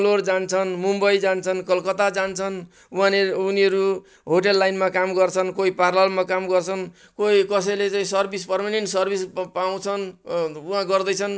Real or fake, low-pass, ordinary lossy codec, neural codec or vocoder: real; none; none; none